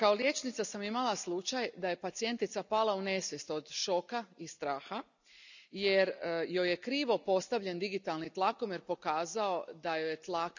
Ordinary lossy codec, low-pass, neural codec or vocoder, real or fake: none; 7.2 kHz; none; real